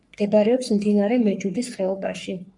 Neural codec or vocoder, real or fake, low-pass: codec, 44.1 kHz, 3.4 kbps, Pupu-Codec; fake; 10.8 kHz